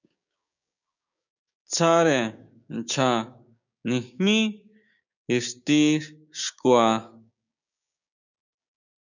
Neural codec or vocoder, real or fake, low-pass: codec, 16 kHz, 6 kbps, DAC; fake; 7.2 kHz